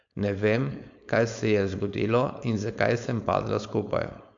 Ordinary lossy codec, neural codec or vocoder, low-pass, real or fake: MP3, 64 kbps; codec, 16 kHz, 4.8 kbps, FACodec; 7.2 kHz; fake